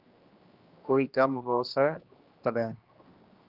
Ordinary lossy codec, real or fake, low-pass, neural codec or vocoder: Opus, 32 kbps; fake; 5.4 kHz; codec, 16 kHz, 1 kbps, X-Codec, HuBERT features, trained on general audio